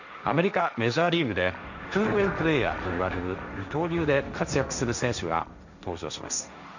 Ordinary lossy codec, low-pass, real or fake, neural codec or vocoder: none; 7.2 kHz; fake; codec, 16 kHz, 1.1 kbps, Voila-Tokenizer